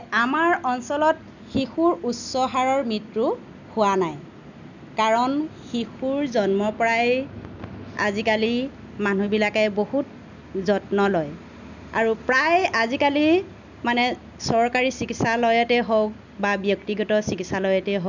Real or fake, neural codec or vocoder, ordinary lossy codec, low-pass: real; none; none; 7.2 kHz